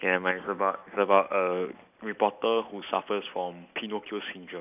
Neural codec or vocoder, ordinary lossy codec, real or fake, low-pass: none; none; real; 3.6 kHz